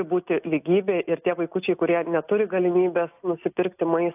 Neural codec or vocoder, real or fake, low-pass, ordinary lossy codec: none; real; 3.6 kHz; AAC, 32 kbps